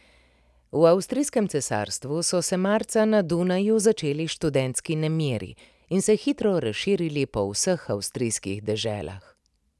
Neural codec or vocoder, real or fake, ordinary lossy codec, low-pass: none; real; none; none